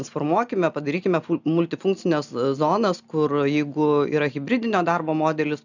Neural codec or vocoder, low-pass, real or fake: none; 7.2 kHz; real